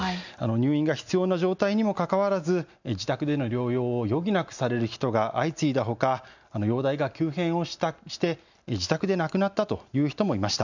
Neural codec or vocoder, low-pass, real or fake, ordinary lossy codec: none; 7.2 kHz; real; none